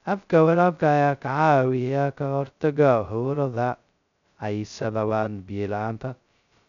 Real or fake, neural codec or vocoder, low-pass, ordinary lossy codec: fake; codec, 16 kHz, 0.2 kbps, FocalCodec; 7.2 kHz; none